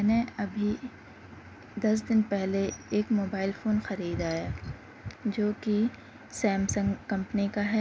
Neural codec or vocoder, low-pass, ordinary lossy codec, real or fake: none; none; none; real